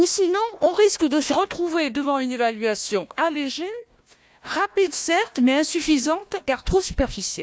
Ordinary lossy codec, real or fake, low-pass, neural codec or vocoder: none; fake; none; codec, 16 kHz, 1 kbps, FunCodec, trained on Chinese and English, 50 frames a second